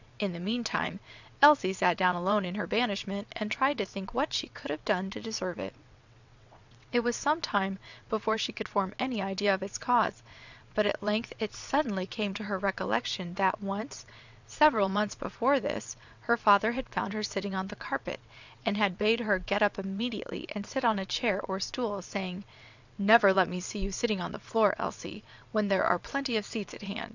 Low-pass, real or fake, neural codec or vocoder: 7.2 kHz; fake; vocoder, 22.05 kHz, 80 mel bands, WaveNeXt